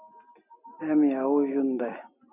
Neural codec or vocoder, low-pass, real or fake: none; 3.6 kHz; real